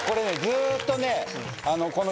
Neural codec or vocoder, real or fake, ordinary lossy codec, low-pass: none; real; none; none